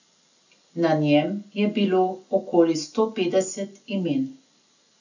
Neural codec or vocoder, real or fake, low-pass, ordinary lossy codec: none; real; 7.2 kHz; none